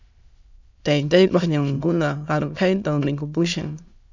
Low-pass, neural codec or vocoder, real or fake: 7.2 kHz; autoencoder, 22.05 kHz, a latent of 192 numbers a frame, VITS, trained on many speakers; fake